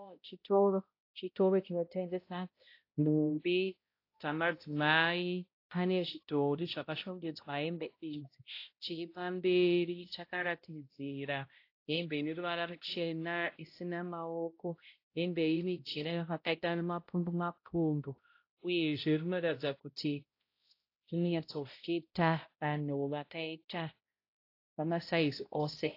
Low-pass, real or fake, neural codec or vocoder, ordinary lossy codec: 5.4 kHz; fake; codec, 16 kHz, 0.5 kbps, X-Codec, HuBERT features, trained on balanced general audio; AAC, 32 kbps